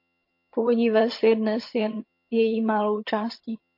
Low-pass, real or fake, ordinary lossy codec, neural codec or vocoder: 5.4 kHz; fake; MP3, 32 kbps; vocoder, 22.05 kHz, 80 mel bands, HiFi-GAN